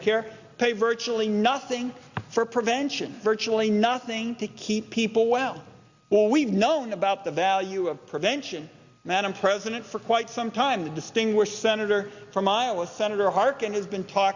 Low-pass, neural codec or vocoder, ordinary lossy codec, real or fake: 7.2 kHz; none; Opus, 64 kbps; real